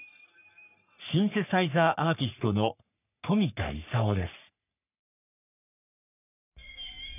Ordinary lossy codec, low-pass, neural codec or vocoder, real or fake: none; 3.6 kHz; codec, 44.1 kHz, 3.4 kbps, Pupu-Codec; fake